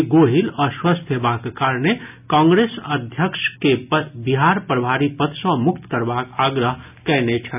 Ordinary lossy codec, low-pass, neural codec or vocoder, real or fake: none; 3.6 kHz; none; real